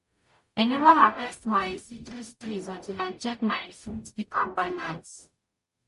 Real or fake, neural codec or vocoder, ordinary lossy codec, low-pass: fake; codec, 44.1 kHz, 0.9 kbps, DAC; MP3, 48 kbps; 14.4 kHz